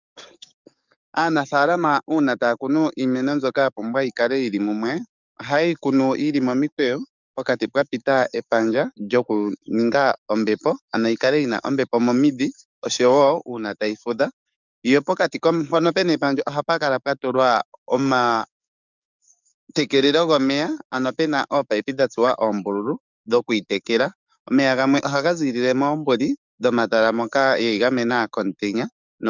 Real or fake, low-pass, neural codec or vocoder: fake; 7.2 kHz; codec, 44.1 kHz, 7.8 kbps, DAC